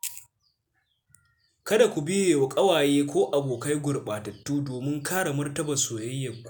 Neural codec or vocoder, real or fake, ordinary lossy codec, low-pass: none; real; none; none